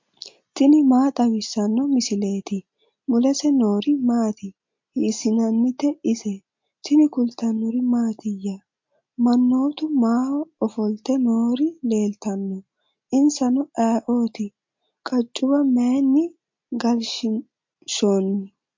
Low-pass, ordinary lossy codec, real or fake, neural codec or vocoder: 7.2 kHz; MP3, 48 kbps; real; none